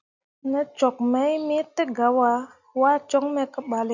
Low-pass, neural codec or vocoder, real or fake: 7.2 kHz; none; real